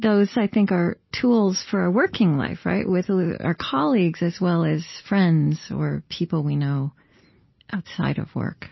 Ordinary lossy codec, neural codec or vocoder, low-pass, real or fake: MP3, 24 kbps; none; 7.2 kHz; real